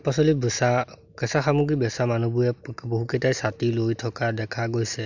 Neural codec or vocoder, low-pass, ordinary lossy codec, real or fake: none; 7.2 kHz; Opus, 64 kbps; real